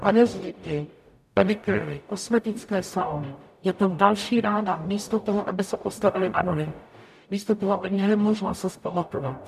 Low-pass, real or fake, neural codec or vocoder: 14.4 kHz; fake; codec, 44.1 kHz, 0.9 kbps, DAC